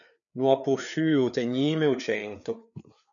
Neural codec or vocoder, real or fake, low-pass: codec, 16 kHz, 4 kbps, FreqCodec, larger model; fake; 7.2 kHz